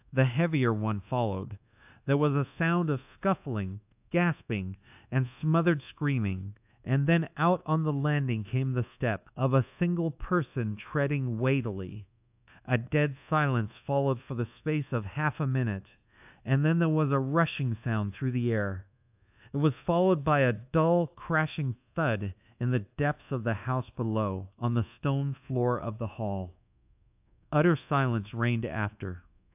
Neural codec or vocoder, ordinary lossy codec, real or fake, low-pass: codec, 24 kHz, 1.2 kbps, DualCodec; AAC, 32 kbps; fake; 3.6 kHz